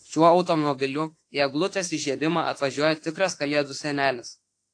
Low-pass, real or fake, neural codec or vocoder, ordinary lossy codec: 9.9 kHz; fake; autoencoder, 48 kHz, 32 numbers a frame, DAC-VAE, trained on Japanese speech; AAC, 48 kbps